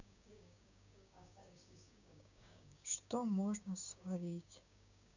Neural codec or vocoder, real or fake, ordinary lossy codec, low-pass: codec, 16 kHz, 6 kbps, DAC; fake; none; 7.2 kHz